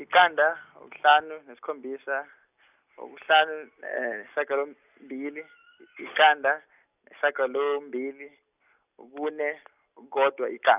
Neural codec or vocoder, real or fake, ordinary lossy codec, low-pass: none; real; none; 3.6 kHz